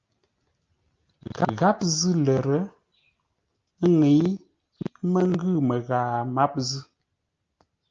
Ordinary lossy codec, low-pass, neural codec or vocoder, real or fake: Opus, 24 kbps; 7.2 kHz; none; real